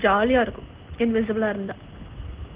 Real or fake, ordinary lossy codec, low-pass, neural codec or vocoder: real; Opus, 16 kbps; 3.6 kHz; none